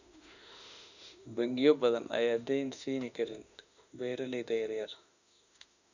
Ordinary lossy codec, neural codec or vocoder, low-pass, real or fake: none; autoencoder, 48 kHz, 32 numbers a frame, DAC-VAE, trained on Japanese speech; 7.2 kHz; fake